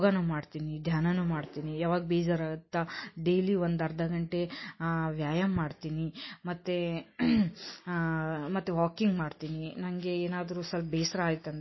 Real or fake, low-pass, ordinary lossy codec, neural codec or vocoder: real; 7.2 kHz; MP3, 24 kbps; none